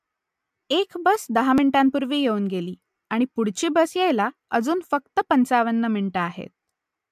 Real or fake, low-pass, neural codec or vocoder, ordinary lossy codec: real; 14.4 kHz; none; MP3, 96 kbps